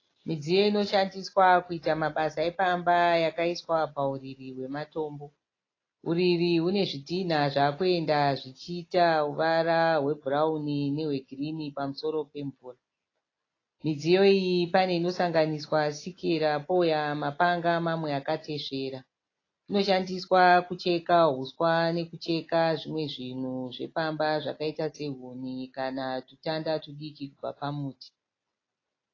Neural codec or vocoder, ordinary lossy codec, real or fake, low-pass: none; AAC, 32 kbps; real; 7.2 kHz